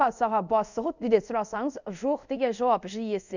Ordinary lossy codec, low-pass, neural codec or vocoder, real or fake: none; 7.2 kHz; codec, 24 kHz, 0.5 kbps, DualCodec; fake